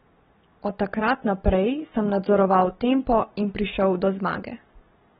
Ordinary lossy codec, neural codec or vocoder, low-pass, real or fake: AAC, 16 kbps; none; 7.2 kHz; real